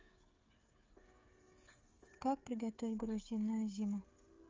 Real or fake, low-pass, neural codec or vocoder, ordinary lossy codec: fake; 7.2 kHz; codec, 16 kHz, 16 kbps, FreqCodec, smaller model; Opus, 32 kbps